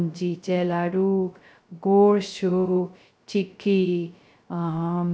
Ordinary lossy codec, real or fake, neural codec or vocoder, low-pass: none; fake; codec, 16 kHz, 0.2 kbps, FocalCodec; none